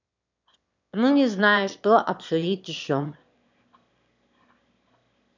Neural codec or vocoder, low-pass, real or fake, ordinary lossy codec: autoencoder, 22.05 kHz, a latent of 192 numbers a frame, VITS, trained on one speaker; 7.2 kHz; fake; none